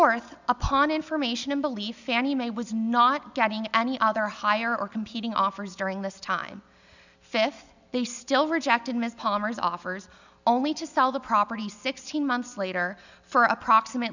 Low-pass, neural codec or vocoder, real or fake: 7.2 kHz; none; real